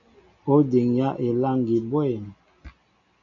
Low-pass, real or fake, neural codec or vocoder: 7.2 kHz; real; none